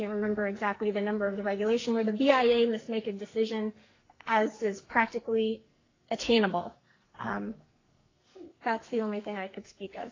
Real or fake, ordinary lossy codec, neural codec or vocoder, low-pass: fake; AAC, 32 kbps; codec, 44.1 kHz, 2.6 kbps, SNAC; 7.2 kHz